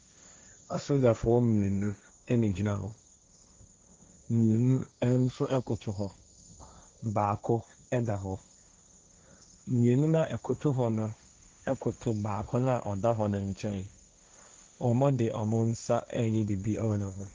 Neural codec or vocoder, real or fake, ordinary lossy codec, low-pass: codec, 16 kHz, 1.1 kbps, Voila-Tokenizer; fake; Opus, 32 kbps; 7.2 kHz